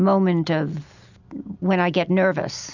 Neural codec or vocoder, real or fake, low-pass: none; real; 7.2 kHz